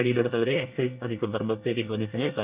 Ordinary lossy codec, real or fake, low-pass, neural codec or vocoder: Opus, 64 kbps; fake; 3.6 kHz; codec, 24 kHz, 1 kbps, SNAC